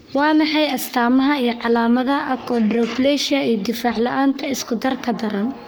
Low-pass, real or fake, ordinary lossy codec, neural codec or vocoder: none; fake; none; codec, 44.1 kHz, 3.4 kbps, Pupu-Codec